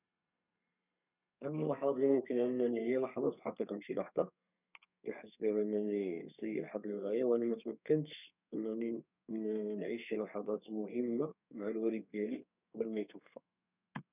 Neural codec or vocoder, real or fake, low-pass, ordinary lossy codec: codec, 32 kHz, 1.9 kbps, SNAC; fake; 3.6 kHz; none